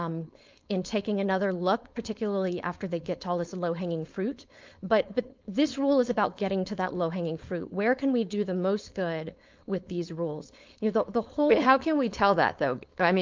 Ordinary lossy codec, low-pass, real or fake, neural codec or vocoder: Opus, 24 kbps; 7.2 kHz; fake; codec, 16 kHz, 4.8 kbps, FACodec